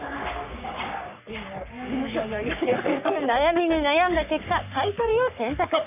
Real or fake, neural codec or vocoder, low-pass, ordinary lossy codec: fake; codec, 44.1 kHz, 3.4 kbps, Pupu-Codec; 3.6 kHz; none